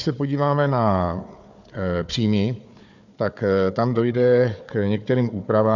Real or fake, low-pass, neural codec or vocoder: fake; 7.2 kHz; codec, 16 kHz, 4 kbps, FunCodec, trained on Chinese and English, 50 frames a second